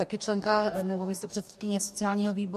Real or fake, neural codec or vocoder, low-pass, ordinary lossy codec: fake; codec, 44.1 kHz, 2.6 kbps, DAC; 14.4 kHz; MP3, 64 kbps